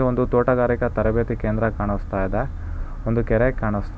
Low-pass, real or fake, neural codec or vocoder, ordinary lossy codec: none; real; none; none